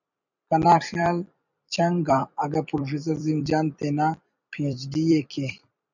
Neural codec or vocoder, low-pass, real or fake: vocoder, 44.1 kHz, 128 mel bands every 256 samples, BigVGAN v2; 7.2 kHz; fake